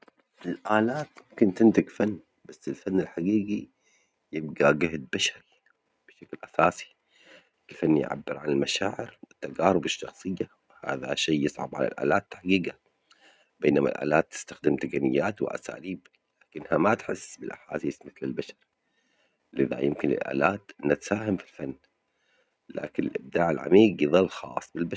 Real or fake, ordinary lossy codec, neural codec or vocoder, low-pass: real; none; none; none